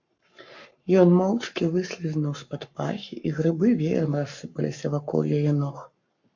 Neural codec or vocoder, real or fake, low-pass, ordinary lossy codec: codec, 44.1 kHz, 7.8 kbps, Pupu-Codec; fake; 7.2 kHz; MP3, 64 kbps